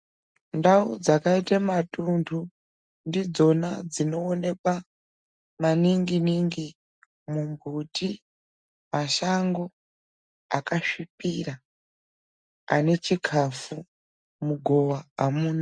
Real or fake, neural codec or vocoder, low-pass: real; none; 9.9 kHz